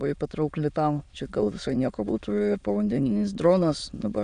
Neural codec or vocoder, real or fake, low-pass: autoencoder, 22.05 kHz, a latent of 192 numbers a frame, VITS, trained on many speakers; fake; 9.9 kHz